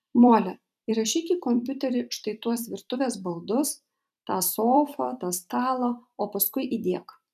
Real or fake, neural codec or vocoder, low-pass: fake; vocoder, 44.1 kHz, 128 mel bands every 256 samples, BigVGAN v2; 14.4 kHz